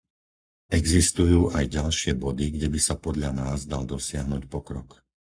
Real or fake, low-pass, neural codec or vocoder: fake; 9.9 kHz; codec, 44.1 kHz, 7.8 kbps, Pupu-Codec